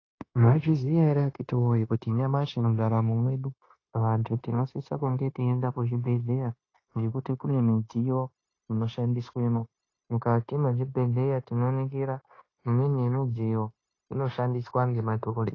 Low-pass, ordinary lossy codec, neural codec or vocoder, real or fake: 7.2 kHz; AAC, 32 kbps; codec, 16 kHz, 0.9 kbps, LongCat-Audio-Codec; fake